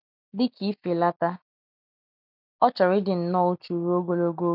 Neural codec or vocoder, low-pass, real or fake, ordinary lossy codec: none; 5.4 kHz; real; none